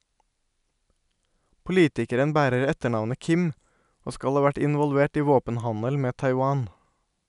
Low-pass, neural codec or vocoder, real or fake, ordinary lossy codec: 10.8 kHz; none; real; none